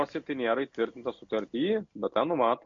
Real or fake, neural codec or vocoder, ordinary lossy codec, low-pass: real; none; MP3, 48 kbps; 7.2 kHz